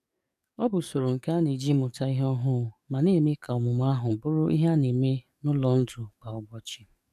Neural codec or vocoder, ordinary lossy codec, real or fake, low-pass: codec, 44.1 kHz, 7.8 kbps, DAC; none; fake; 14.4 kHz